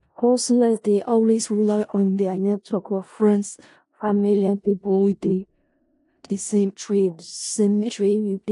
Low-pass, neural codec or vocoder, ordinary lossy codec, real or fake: 10.8 kHz; codec, 16 kHz in and 24 kHz out, 0.4 kbps, LongCat-Audio-Codec, four codebook decoder; AAC, 48 kbps; fake